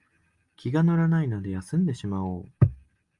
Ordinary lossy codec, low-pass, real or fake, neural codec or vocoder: Opus, 64 kbps; 10.8 kHz; real; none